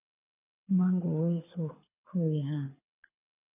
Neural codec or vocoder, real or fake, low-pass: codec, 24 kHz, 6 kbps, HILCodec; fake; 3.6 kHz